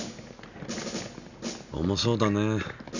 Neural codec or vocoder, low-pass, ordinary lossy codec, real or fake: none; 7.2 kHz; none; real